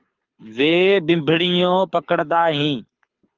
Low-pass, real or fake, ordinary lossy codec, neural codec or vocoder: 7.2 kHz; fake; Opus, 32 kbps; codec, 24 kHz, 6 kbps, HILCodec